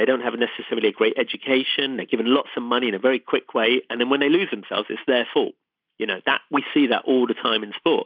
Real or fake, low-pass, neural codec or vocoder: real; 5.4 kHz; none